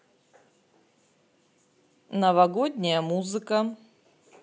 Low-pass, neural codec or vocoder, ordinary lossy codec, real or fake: none; none; none; real